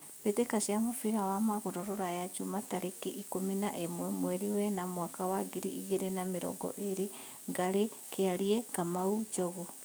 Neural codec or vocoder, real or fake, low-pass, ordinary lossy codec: codec, 44.1 kHz, 7.8 kbps, DAC; fake; none; none